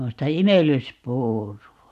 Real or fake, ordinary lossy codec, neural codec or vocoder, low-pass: real; none; none; 14.4 kHz